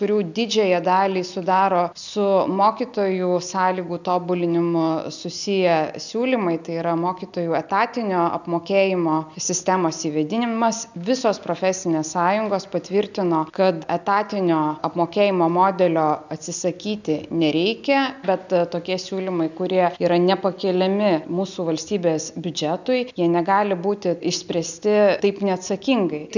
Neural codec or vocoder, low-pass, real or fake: none; 7.2 kHz; real